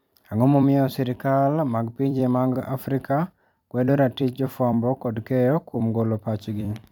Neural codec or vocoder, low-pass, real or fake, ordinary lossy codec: vocoder, 44.1 kHz, 128 mel bands every 256 samples, BigVGAN v2; 19.8 kHz; fake; none